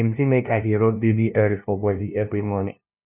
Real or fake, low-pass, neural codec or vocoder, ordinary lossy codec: fake; 3.6 kHz; codec, 16 kHz, 0.5 kbps, FunCodec, trained on LibriTTS, 25 frames a second; none